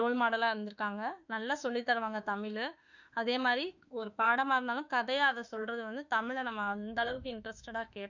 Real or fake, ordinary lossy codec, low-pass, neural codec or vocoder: fake; none; 7.2 kHz; autoencoder, 48 kHz, 32 numbers a frame, DAC-VAE, trained on Japanese speech